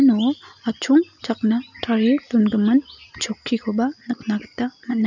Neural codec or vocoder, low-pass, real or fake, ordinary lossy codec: none; 7.2 kHz; real; none